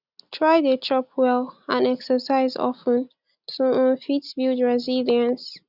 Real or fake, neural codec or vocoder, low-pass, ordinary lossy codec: real; none; 5.4 kHz; none